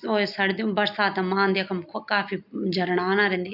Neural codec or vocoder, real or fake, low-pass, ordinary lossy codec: none; real; 5.4 kHz; none